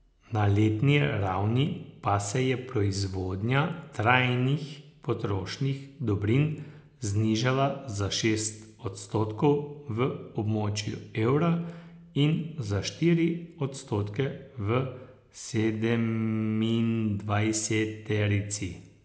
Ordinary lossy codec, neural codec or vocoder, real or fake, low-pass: none; none; real; none